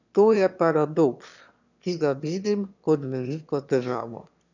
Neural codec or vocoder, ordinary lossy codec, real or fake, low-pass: autoencoder, 22.05 kHz, a latent of 192 numbers a frame, VITS, trained on one speaker; none; fake; 7.2 kHz